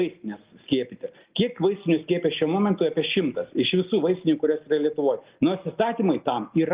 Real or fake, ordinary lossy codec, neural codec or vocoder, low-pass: real; Opus, 32 kbps; none; 3.6 kHz